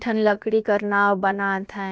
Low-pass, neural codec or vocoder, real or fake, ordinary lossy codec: none; codec, 16 kHz, about 1 kbps, DyCAST, with the encoder's durations; fake; none